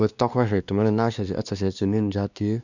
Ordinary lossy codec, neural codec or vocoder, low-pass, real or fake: none; codec, 16 kHz, 2 kbps, X-Codec, WavLM features, trained on Multilingual LibriSpeech; 7.2 kHz; fake